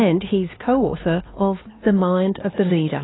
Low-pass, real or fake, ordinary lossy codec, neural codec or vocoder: 7.2 kHz; fake; AAC, 16 kbps; codec, 16 kHz, 4 kbps, X-Codec, HuBERT features, trained on LibriSpeech